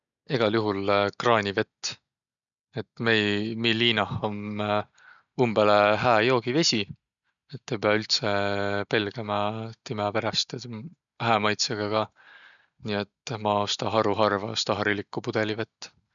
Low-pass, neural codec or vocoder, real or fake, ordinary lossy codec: 7.2 kHz; none; real; none